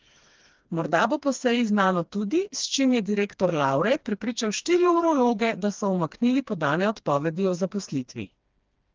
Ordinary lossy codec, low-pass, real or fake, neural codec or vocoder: Opus, 32 kbps; 7.2 kHz; fake; codec, 16 kHz, 2 kbps, FreqCodec, smaller model